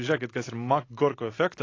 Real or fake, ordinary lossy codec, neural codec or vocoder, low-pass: real; AAC, 32 kbps; none; 7.2 kHz